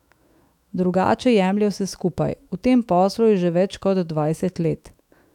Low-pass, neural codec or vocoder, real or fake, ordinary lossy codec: 19.8 kHz; autoencoder, 48 kHz, 128 numbers a frame, DAC-VAE, trained on Japanese speech; fake; none